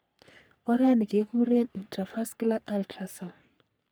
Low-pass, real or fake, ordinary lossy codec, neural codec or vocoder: none; fake; none; codec, 44.1 kHz, 3.4 kbps, Pupu-Codec